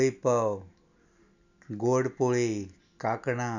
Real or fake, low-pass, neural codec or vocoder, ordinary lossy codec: real; 7.2 kHz; none; none